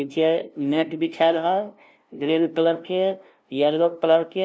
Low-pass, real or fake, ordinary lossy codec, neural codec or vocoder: none; fake; none; codec, 16 kHz, 0.5 kbps, FunCodec, trained on LibriTTS, 25 frames a second